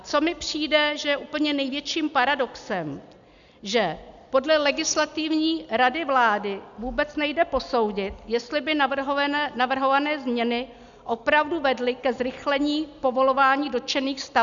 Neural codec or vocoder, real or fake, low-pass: none; real; 7.2 kHz